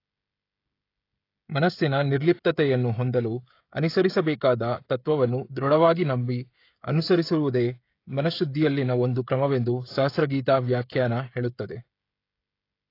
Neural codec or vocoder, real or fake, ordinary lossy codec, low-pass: codec, 16 kHz, 16 kbps, FreqCodec, smaller model; fake; AAC, 32 kbps; 5.4 kHz